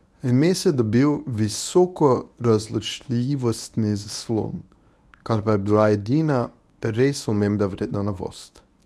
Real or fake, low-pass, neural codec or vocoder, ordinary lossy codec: fake; none; codec, 24 kHz, 0.9 kbps, WavTokenizer, medium speech release version 1; none